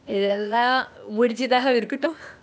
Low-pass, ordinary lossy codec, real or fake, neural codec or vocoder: none; none; fake; codec, 16 kHz, 0.8 kbps, ZipCodec